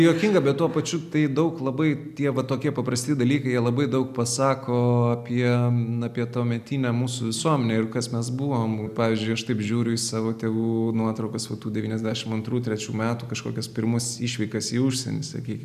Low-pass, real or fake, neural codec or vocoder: 14.4 kHz; real; none